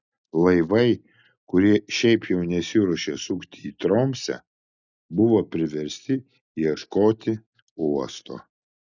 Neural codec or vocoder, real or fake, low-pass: none; real; 7.2 kHz